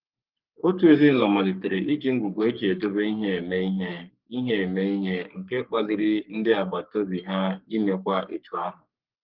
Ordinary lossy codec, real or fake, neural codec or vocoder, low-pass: Opus, 32 kbps; fake; codec, 44.1 kHz, 3.4 kbps, Pupu-Codec; 5.4 kHz